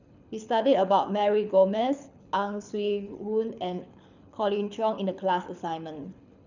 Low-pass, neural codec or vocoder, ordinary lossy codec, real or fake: 7.2 kHz; codec, 24 kHz, 6 kbps, HILCodec; none; fake